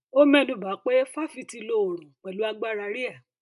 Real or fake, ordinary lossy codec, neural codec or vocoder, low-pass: real; none; none; 10.8 kHz